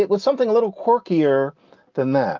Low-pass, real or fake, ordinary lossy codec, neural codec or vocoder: 7.2 kHz; real; Opus, 24 kbps; none